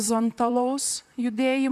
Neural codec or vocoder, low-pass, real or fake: vocoder, 44.1 kHz, 128 mel bands, Pupu-Vocoder; 14.4 kHz; fake